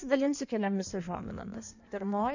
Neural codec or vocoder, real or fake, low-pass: codec, 16 kHz in and 24 kHz out, 1.1 kbps, FireRedTTS-2 codec; fake; 7.2 kHz